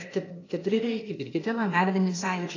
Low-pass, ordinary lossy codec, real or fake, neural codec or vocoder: 7.2 kHz; AAC, 32 kbps; fake; codec, 16 kHz, 0.8 kbps, ZipCodec